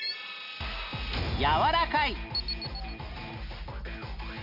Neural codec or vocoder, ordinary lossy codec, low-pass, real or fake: none; none; 5.4 kHz; real